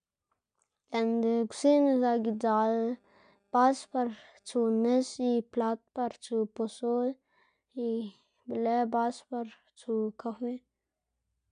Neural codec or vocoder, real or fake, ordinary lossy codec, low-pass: none; real; none; 9.9 kHz